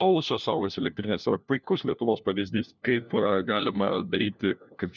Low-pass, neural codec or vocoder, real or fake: 7.2 kHz; codec, 16 kHz, 1 kbps, FunCodec, trained on LibriTTS, 50 frames a second; fake